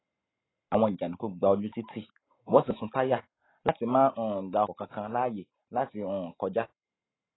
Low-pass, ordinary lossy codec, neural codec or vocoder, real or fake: 7.2 kHz; AAC, 16 kbps; none; real